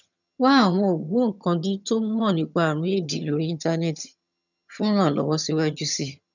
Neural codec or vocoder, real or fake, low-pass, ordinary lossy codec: vocoder, 22.05 kHz, 80 mel bands, HiFi-GAN; fake; 7.2 kHz; none